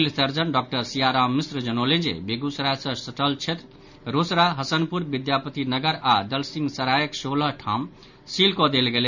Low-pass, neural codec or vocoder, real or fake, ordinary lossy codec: 7.2 kHz; none; real; none